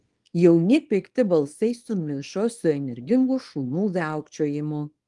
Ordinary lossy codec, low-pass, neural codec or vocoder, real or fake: Opus, 24 kbps; 10.8 kHz; codec, 24 kHz, 0.9 kbps, WavTokenizer, small release; fake